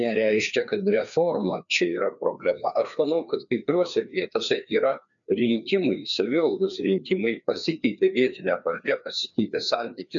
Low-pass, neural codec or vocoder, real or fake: 7.2 kHz; codec, 16 kHz, 2 kbps, FreqCodec, larger model; fake